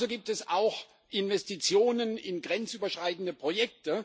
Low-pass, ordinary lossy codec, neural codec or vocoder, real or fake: none; none; none; real